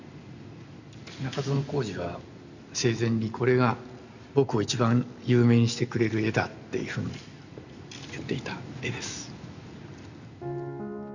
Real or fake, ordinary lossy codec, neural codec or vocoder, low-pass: real; none; none; 7.2 kHz